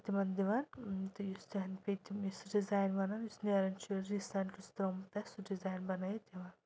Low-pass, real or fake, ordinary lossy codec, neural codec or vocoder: none; real; none; none